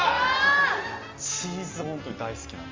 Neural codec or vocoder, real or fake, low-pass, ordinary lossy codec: none; real; 7.2 kHz; Opus, 32 kbps